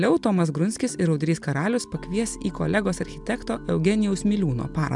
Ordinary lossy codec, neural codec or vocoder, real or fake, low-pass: MP3, 96 kbps; none; real; 10.8 kHz